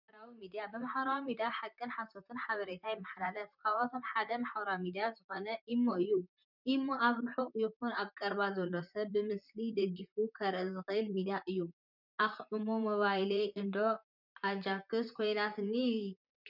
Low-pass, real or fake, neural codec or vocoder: 5.4 kHz; fake; vocoder, 44.1 kHz, 128 mel bands, Pupu-Vocoder